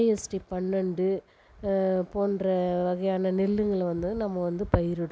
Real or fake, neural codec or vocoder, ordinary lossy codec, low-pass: real; none; none; none